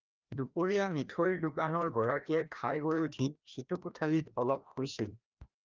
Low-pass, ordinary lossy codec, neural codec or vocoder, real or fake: 7.2 kHz; Opus, 24 kbps; codec, 16 kHz, 1 kbps, FreqCodec, larger model; fake